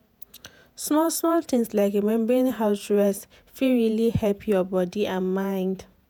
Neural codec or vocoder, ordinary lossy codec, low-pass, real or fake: vocoder, 48 kHz, 128 mel bands, Vocos; none; none; fake